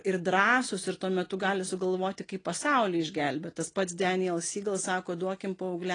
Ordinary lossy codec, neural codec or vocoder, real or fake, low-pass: AAC, 32 kbps; none; real; 9.9 kHz